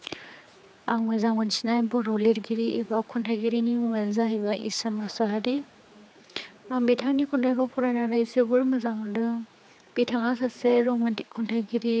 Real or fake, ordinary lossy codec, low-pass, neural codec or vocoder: fake; none; none; codec, 16 kHz, 4 kbps, X-Codec, HuBERT features, trained on general audio